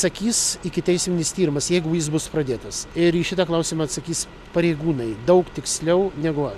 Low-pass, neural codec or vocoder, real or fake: 14.4 kHz; none; real